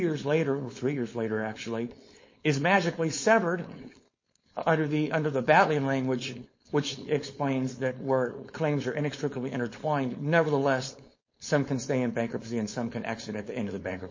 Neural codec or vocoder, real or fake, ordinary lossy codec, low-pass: codec, 16 kHz, 4.8 kbps, FACodec; fake; MP3, 32 kbps; 7.2 kHz